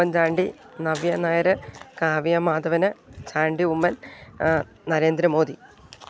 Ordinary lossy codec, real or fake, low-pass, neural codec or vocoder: none; real; none; none